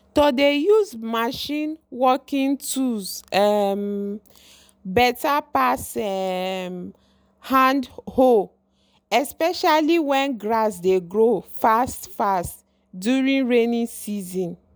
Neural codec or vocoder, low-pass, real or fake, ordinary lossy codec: none; none; real; none